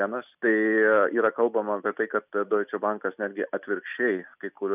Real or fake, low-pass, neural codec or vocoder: real; 3.6 kHz; none